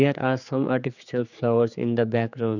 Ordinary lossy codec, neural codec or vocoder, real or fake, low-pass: none; codec, 16 kHz, 4 kbps, X-Codec, HuBERT features, trained on general audio; fake; 7.2 kHz